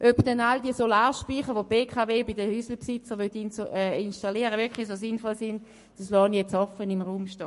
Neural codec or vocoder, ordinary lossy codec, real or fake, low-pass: codec, 44.1 kHz, 7.8 kbps, Pupu-Codec; MP3, 48 kbps; fake; 14.4 kHz